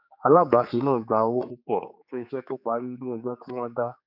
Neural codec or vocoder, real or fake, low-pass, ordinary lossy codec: codec, 16 kHz, 4 kbps, X-Codec, HuBERT features, trained on general audio; fake; 5.4 kHz; none